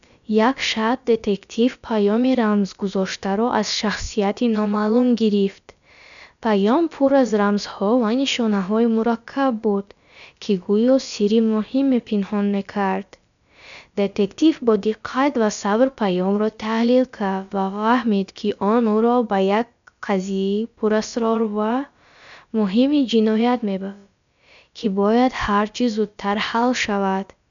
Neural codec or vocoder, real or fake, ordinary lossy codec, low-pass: codec, 16 kHz, about 1 kbps, DyCAST, with the encoder's durations; fake; none; 7.2 kHz